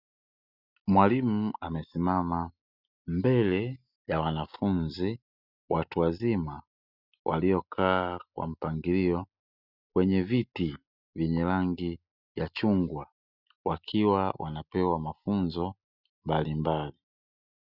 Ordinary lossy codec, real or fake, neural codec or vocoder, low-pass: Opus, 64 kbps; real; none; 5.4 kHz